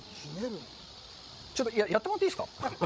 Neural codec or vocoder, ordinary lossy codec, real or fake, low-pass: codec, 16 kHz, 16 kbps, FreqCodec, larger model; none; fake; none